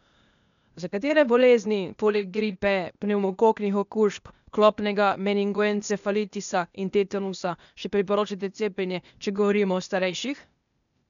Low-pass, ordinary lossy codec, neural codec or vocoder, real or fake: 7.2 kHz; none; codec, 16 kHz, 0.8 kbps, ZipCodec; fake